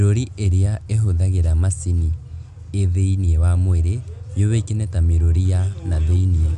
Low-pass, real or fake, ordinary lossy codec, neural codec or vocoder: 10.8 kHz; real; none; none